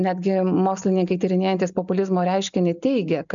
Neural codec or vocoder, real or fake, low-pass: none; real; 7.2 kHz